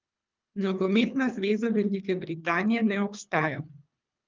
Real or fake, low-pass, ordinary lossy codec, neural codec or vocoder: fake; 7.2 kHz; Opus, 24 kbps; codec, 24 kHz, 3 kbps, HILCodec